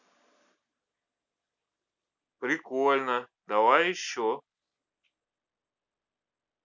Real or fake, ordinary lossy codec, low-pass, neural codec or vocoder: real; none; 7.2 kHz; none